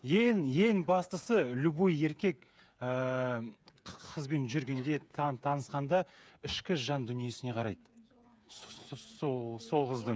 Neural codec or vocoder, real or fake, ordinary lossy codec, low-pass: codec, 16 kHz, 8 kbps, FreqCodec, smaller model; fake; none; none